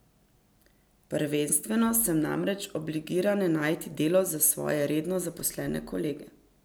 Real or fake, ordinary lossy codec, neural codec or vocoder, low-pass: real; none; none; none